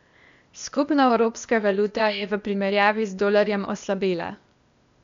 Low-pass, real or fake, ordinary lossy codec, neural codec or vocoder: 7.2 kHz; fake; MP3, 64 kbps; codec, 16 kHz, 0.8 kbps, ZipCodec